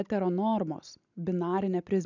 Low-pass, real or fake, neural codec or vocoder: 7.2 kHz; real; none